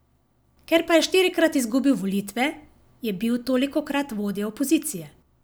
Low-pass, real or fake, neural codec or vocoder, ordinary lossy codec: none; real; none; none